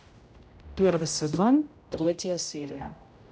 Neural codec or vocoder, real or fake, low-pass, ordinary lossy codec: codec, 16 kHz, 0.5 kbps, X-Codec, HuBERT features, trained on general audio; fake; none; none